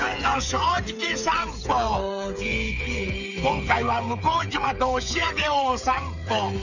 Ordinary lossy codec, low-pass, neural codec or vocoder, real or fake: none; 7.2 kHz; codec, 16 kHz, 8 kbps, FreqCodec, smaller model; fake